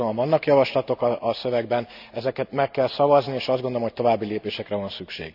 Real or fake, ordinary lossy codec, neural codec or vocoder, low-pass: real; none; none; 5.4 kHz